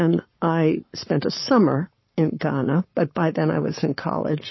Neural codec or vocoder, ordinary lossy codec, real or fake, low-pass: codec, 44.1 kHz, 7.8 kbps, Pupu-Codec; MP3, 24 kbps; fake; 7.2 kHz